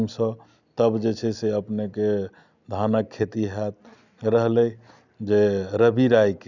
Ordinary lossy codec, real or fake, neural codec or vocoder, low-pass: none; real; none; 7.2 kHz